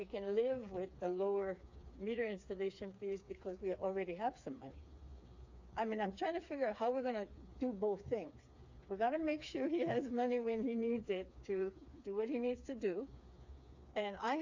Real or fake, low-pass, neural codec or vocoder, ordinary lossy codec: fake; 7.2 kHz; codec, 16 kHz, 4 kbps, FreqCodec, smaller model; AAC, 48 kbps